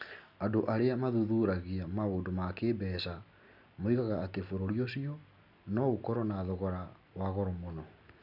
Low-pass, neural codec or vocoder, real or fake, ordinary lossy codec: 5.4 kHz; none; real; none